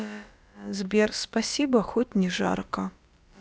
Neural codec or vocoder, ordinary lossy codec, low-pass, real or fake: codec, 16 kHz, about 1 kbps, DyCAST, with the encoder's durations; none; none; fake